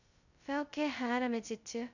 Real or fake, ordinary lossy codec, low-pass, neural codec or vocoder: fake; none; 7.2 kHz; codec, 16 kHz, 0.2 kbps, FocalCodec